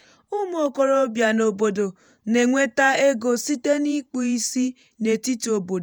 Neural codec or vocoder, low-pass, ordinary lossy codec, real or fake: vocoder, 48 kHz, 128 mel bands, Vocos; none; none; fake